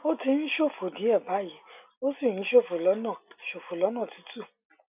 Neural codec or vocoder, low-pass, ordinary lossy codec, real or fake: none; 3.6 kHz; none; real